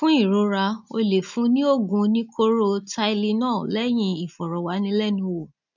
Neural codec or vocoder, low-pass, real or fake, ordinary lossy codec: none; 7.2 kHz; real; none